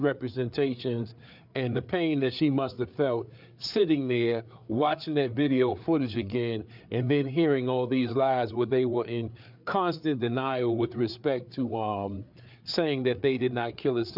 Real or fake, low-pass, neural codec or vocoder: fake; 5.4 kHz; codec, 16 kHz, 4 kbps, FreqCodec, larger model